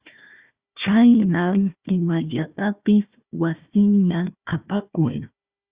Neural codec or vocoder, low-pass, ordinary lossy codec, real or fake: codec, 16 kHz, 1 kbps, FunCodec, trained on Chinese and English, 50 frames a second; 3.6 kHz; Opus, 64 kbps; fake